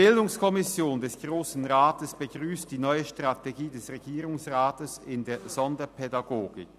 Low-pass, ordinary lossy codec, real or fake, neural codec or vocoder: 14.4 kHz; none; real; none